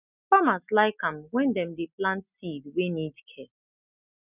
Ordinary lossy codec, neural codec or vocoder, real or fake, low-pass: none; none; real; 3.6 kHz